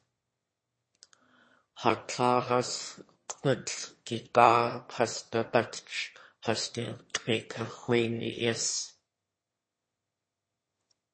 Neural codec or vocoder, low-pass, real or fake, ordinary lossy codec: autoencoder, 22.05 kHz, a latent of 192 numbers a frame, VITS, trained on one speaker; 9.9 kHz; fake; MP3, 32 kbps